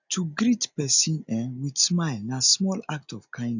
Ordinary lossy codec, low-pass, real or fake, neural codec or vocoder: none; 7.2 kHz; real; none